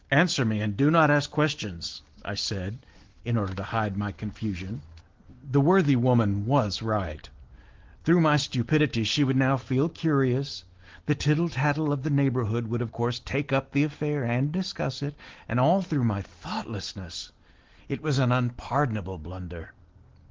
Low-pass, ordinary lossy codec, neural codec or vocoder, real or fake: 7.2 kHz; Opus, 16 kbps; none; real